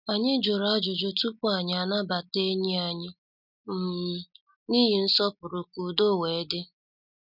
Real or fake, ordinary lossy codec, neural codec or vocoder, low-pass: real; none; none; 5.4 kHz